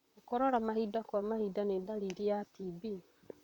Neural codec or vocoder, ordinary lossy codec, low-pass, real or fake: vocoder, 44.1 kHz, 128 mel bands, Pupu-Vocoder; none; 19.8 kHz; fake